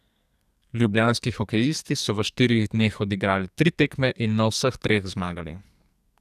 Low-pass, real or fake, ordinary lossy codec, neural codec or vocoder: 14.4 kHz; fake; none; codec, 44.1 kHz, 2.6 kbps, SNAC